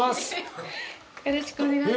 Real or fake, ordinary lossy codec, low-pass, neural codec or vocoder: real; none; none; none